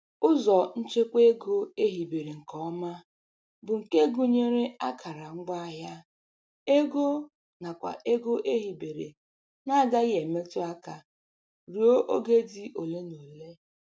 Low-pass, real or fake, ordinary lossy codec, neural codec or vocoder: none; real; none; none